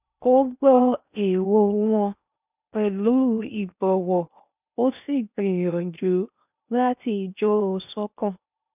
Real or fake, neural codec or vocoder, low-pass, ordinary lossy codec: fake; codec, 16 kHz in and 24 kHz out, 0.8 kbps, FocalCodec, streaming, 65536 codes; 3.6 kHz; none